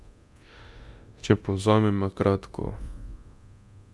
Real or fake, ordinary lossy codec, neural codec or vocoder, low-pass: fake; none; codec, 24 kHz, 0.9 kbps, DualCodec; none